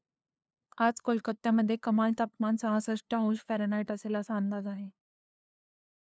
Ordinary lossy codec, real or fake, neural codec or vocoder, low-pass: none; fake; codec, 16 kHz, 2 kbps, FunCodec, trained on LibriTTS, 25 frames a second; none